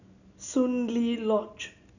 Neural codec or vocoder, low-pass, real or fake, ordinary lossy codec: none; 7.2 kHz; real; none